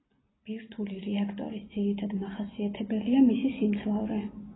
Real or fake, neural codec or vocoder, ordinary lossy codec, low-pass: real; none; AAC, 16 kbps; 7.2 kHz